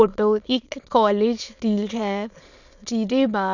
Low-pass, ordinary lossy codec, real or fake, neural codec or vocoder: 7.2 kHz; none; fake; autoencoder, 22.05 kHz, a latent of 192 numbers a frame, VITS, trained on many speakers